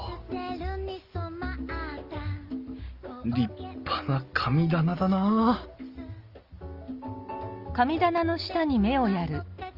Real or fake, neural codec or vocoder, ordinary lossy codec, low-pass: real; none; Opus, 24 kbps; 5.4 kHz